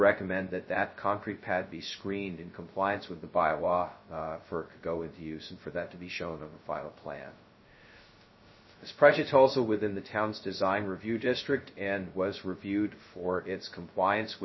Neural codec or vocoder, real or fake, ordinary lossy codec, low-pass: codec, 16 kHz, 0.2 kbps, FocalCodec; fake; MP3, 24 kbps; 7.2 kHz